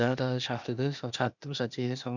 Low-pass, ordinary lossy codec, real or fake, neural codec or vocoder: 7.2 kHz; none; fake; codec, 16 kHz, 0.8 kbps, ZipCodec